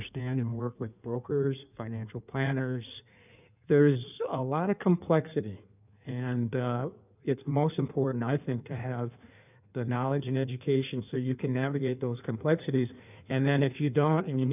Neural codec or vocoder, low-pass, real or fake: codec, 16 kHz in and 24 kHz out, 1.1 kbps, FireRedTTS-2 codec; 3.6 kHz; fake